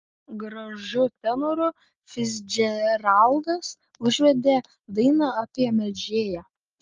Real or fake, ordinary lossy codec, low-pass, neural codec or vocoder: real; Opus, 24 kbps; 7.2 kHz; none